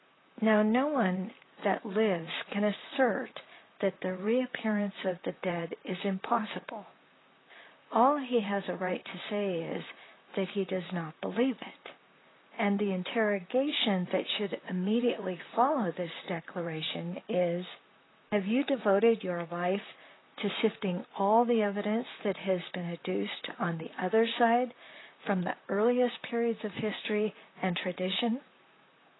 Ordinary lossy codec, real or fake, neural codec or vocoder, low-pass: AAC, 16 kbps; fake; vocoder, 44.1 kHz, 128 mel bands, Pupu-Vocoder; 7.2 kHz